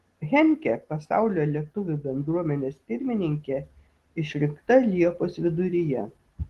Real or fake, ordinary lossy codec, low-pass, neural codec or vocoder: real; Opus, 16 kbps; 14.4 kHz; none